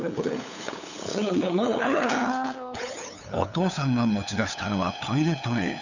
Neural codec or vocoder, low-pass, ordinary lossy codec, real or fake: codec, 16 kHz, 8 kbps, FunCodec, trained on LibriTTS, 25 frames a second; 7.2 kHz; none; fake